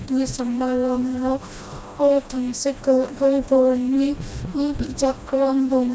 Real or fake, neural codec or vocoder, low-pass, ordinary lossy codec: fake; codec, 16 kHz, 1 kbps, FreqCodec, smaller model; none; none